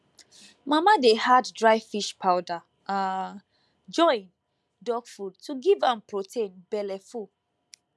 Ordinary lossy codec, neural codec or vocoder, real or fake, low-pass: none; none; real; none